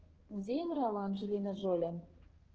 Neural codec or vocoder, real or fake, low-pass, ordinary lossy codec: codec, 16 kHz, 2 kbps, X-Codec, HuBERT features, trained on balanced general audio; fake; 7.2 kHz; Opus, 16 kbps